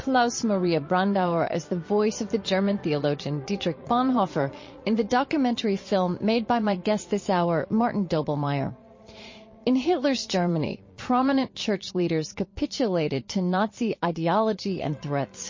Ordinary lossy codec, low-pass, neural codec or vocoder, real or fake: MP3, 32 kbps; 7.2 kHz; vocoder, 22.05 kHz, 80 mel bands, Vocos; fake